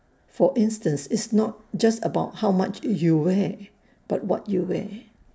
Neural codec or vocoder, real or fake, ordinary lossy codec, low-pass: none; real; none; none